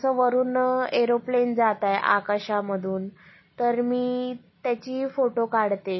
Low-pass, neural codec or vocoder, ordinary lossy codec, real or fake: 7.2 kHz; none; MP3, 24 kbps; real